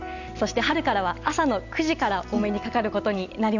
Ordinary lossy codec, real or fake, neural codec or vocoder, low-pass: none; real; none; 7.2 kHz